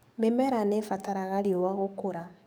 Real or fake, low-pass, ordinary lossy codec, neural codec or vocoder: fake; none; none; codec, 44.1 kHz, 7.8 kbps, DAC